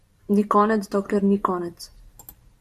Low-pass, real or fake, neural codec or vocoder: 14.4 kHz; fake; vocoder, 44.1 kHz, 128 mel bands every 512 samples, BigVGAN v2